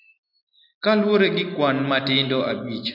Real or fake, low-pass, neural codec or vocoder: real; 5.4 kHz; none